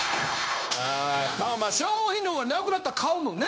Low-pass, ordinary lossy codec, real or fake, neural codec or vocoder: none; none; fake; codec, 16 kHz, 0.9 kbps, LongCat-Audio-Codec